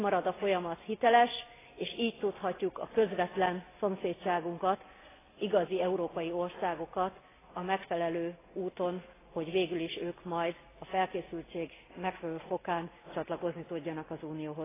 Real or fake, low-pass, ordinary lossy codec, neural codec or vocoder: real; 3.6 kHz; AAC, 16 kbps; none